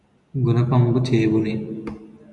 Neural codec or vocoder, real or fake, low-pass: none; real; 10.8 kHz